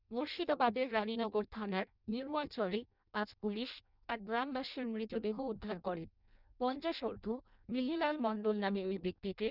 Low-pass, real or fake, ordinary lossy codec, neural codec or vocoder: 5.4 kHz; fake; none; codec, 16 kHz in and 24 kHz out, 0.6 kbps, FireRedTTS-2 codec